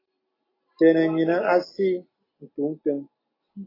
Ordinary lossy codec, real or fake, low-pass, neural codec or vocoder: AAC, 24 kbps; real; 5.4 kHz; none